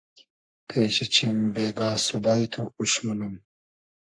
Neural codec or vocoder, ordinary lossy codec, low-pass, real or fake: codec, 44.1 kHz, 3.4 kbps, Pupu-Codec; AAC, 64 kbps; 9.9 kHz; fake